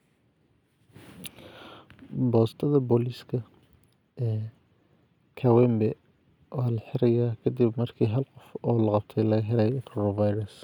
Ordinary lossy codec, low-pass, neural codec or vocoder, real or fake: none; 19.8 kHz; none; real